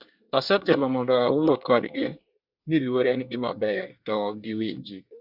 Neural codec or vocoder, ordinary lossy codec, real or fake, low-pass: codec, 24 kHz, 1 kbps, SNAC; Opus, 64 kbps; fake; 5.4 kHz